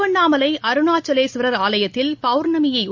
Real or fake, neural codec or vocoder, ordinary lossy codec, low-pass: real; none; MP3, 64 kbps; 7.2 kHz